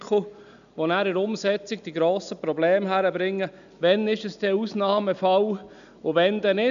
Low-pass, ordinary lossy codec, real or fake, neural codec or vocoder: 7.2 kHz; none; real; none